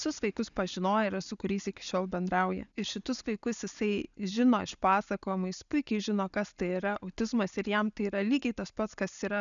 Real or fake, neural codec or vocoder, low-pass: real; none; 7.2 kHz